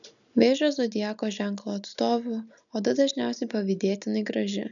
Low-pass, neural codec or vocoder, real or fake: 7.2 kHz; none; real